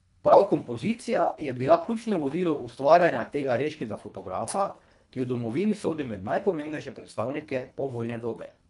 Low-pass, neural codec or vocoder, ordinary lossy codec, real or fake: 10.8 kHz; codec, 24 kHz, 1.5 kbps, HILCodec; none; fake